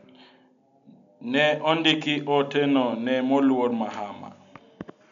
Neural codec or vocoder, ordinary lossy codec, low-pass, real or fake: none; none; 7.2 kHz; real